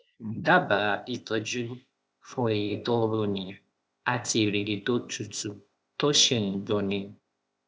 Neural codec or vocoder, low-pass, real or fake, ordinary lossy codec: codec, 16 kHz, 0.8 kbps, ZipCodec; none; fake; none